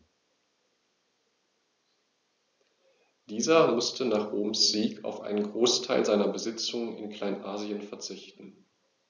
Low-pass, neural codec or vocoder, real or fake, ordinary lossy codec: 7.2 kHz; none; real; none